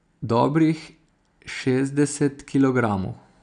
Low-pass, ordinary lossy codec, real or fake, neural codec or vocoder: 9.9 kHz; none; real; none